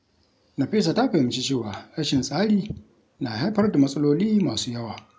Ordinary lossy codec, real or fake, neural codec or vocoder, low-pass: none; real; none; none